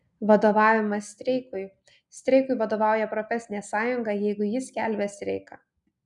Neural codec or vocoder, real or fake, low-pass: none; real; 10.8 kHz